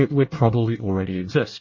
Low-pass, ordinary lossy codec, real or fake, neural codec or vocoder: 7.2 kHz; MP3, 32 kbps; fake; codec, 24 kHz, 1 kbps, SNAC